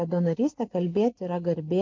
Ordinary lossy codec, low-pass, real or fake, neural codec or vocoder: MP3, 48 kbps; 7.2 kHz; real; none